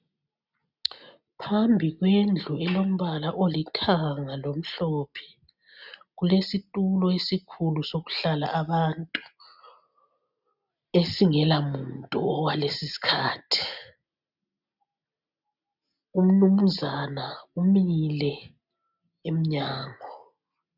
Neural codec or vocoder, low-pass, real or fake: none; 5.4 kHz; real